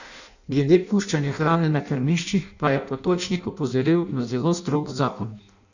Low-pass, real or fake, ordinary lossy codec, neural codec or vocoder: 7.2 kHz; fake; none; codec, 16 kHz in and 24 kHz out, 0.6 kbps, FireRedTTS-2 codec